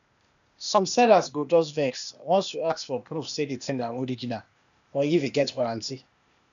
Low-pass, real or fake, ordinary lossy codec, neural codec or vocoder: 7.2 kHz; fake; none; codec, 16 kHz, 0.8 kbps, ZipCodec